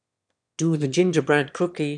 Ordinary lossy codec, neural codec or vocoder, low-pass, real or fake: none; autoencoder, 22.05 kHz, a latent of 192 numbers a frame, VITS, trained on one speaker; 9.9 kHz; fake